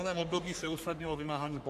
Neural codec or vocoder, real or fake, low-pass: codec, 44.1 kHz, 3.4 kbps, Pupu-Codec; fake; 14.4 kHz